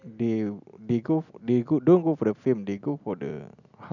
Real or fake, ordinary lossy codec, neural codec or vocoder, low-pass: real; none; none; 7.2 kHz